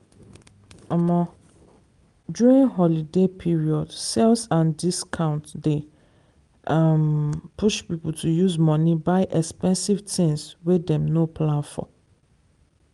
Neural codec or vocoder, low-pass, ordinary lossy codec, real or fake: none; 10.8 kHz; Opus, 32 kbps; real